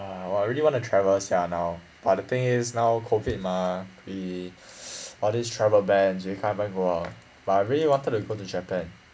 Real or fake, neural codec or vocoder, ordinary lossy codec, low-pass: real; none; none; none